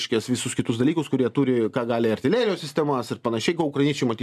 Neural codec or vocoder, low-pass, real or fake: none; 14.4 kHz; real